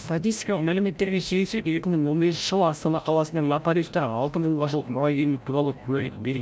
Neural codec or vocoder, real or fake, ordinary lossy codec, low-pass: codec, 16 kHz, 0.5 kbps, FreqCodec, larger model; fake; none; none